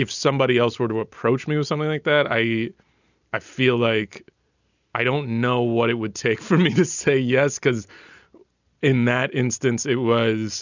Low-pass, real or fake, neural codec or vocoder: 7.2 kHz; real; none